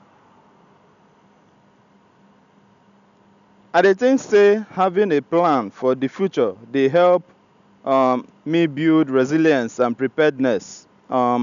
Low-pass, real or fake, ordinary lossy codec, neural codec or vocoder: 7.2 kHz; real; none; none